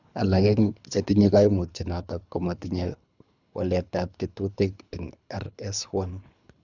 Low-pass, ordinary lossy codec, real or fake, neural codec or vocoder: 7.2 kHz; none; fake; codec, 24 kHz, 3 kbps, HILCodec